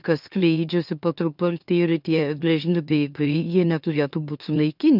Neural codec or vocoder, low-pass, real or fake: autoencoder, 44.1 kHz, a latent of 192 numbers a frame, MeloTTS; 5.4 kHz; fake